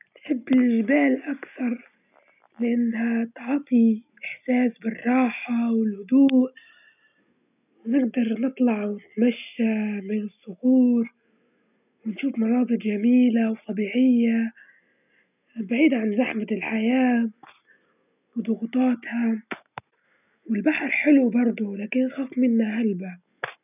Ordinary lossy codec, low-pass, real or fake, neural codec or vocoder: none; 3.6 kHz; real; none